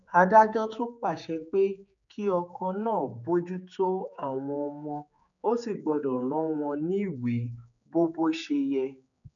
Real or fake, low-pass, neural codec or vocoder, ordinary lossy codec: fake; 7.2 kHz; codec, 16 kHz, 4 kbps, X-Codec, HuBERT features, trained on general audio; none